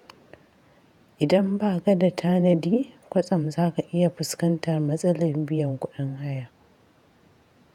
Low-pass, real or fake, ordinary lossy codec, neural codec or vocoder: 19.8 kHz; fake; none; vocoder, 48 kHz, 128 mel bands, Vocos